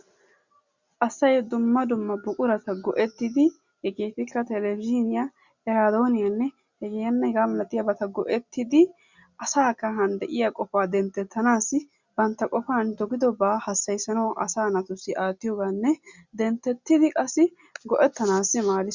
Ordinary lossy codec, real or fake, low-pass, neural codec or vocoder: Opus, 64 kbps; real; 7.2 kHz; none